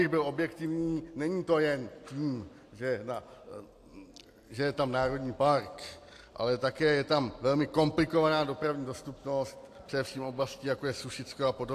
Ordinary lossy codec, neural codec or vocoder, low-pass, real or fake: AAC, 64 kbps; none; 14.4 kHz; real